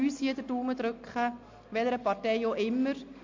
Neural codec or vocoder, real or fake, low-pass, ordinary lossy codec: none; real; 7.2 kHz; MP3, 48 kbps